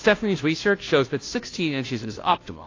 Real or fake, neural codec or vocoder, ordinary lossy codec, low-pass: fake; codec, 16 kHz, 0.5 kbps, FunCodec, trained on Chinese and English, 25 frames a second; AAC, 32 kbps; 7.2 kHz